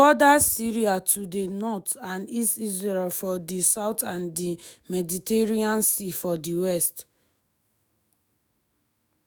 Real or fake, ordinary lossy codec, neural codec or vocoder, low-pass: fake; none; autoencoder, 48 kHz, 128 numbers a frame, DAC-VAE, trained on Japanese speech; none